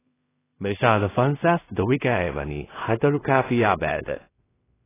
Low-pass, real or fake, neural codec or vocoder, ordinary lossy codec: 3.6 kHz; fake; codec, 16 kHz in and 24 kHz out, 0.4 kbps, LongCat-Audio-Codec, two codebook decoder; AAC, 16 kbps